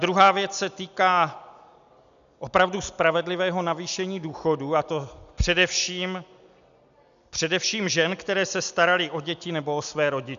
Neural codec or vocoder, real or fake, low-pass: none; real; 7.2 kHz